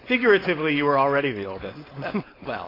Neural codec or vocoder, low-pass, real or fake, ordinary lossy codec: codec, 16 kHz, 4.8 kbps, FACodec; 5.4 kHz; fake; AAC, 24 kbps